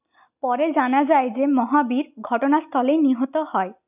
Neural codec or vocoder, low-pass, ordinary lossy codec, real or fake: none; 3.6 kHz; none; real